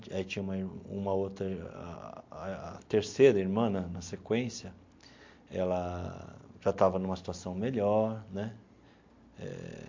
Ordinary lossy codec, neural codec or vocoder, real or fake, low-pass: MP3, 48 kbps; none; real; 7.2 kHz